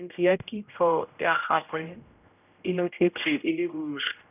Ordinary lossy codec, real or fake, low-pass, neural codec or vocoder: none; fake; 3.6 kHz; codec, 16 kHz, 0.5 kbps, X-Codec, HuBERT features, trained on general audio